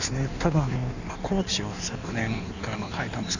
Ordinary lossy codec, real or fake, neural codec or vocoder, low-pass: none; fake; codec, 16 kHz in and 24 kHz out, 1.1 kbps, FireRedTTS-2 codec; 7.2 kHz